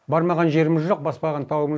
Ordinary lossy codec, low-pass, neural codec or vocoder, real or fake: none; none; none; real